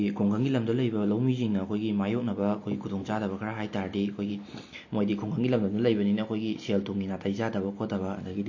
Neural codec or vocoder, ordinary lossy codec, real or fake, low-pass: none; MP3, 32 kbps; real; 7.2 kHz